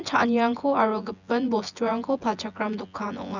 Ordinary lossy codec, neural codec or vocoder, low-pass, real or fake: none; vocoder, 24 kHz, 100 mel bands, Vocos; 7.2 kHz; fake